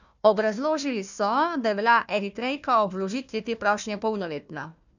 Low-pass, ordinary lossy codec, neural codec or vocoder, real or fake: 7.2 kHz; none; codec, 16 kHz, 1 kbps, FunCodec, trained on Chinese and English, 50 frames a second; fake